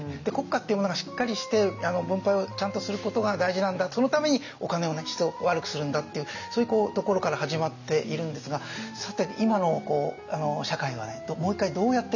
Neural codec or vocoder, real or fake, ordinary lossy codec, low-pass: none; real; none; 7.2 kHz